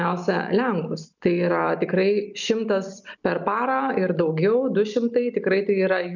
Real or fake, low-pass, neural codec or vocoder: real; 7.2 kHz; none